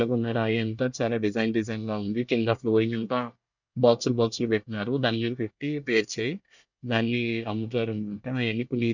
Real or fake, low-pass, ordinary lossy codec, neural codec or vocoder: fake; 7.2 kHz; none; codec, 24 kHz, 1 kbps, SNAC